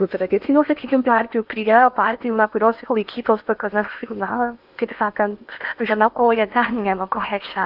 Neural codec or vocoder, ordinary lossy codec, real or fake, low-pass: codec, 16 kHz in and 24 kHz out, 0.8 kbps, FocalCodec, streaming, 65536 codes; AAC, 48 kbps; fake; 5.4 kHz